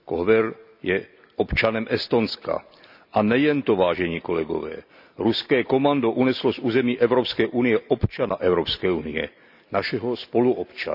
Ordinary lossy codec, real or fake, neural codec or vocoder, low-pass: none; real; none; 5.4 kHz